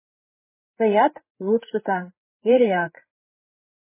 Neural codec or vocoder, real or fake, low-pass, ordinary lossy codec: codec, 16 kHz, 8 kbps, FreqCodec, larger model; fake; 3.6 kHz; MP3, 16 kbps